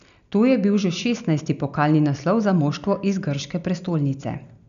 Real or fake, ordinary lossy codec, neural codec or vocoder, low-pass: real; none; none; 7.2 kHz